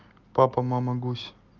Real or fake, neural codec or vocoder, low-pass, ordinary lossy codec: real; none; 7.2 kHz; Opus, 32 kbps